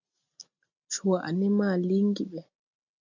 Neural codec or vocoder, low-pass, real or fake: none; 7.2 kHz; real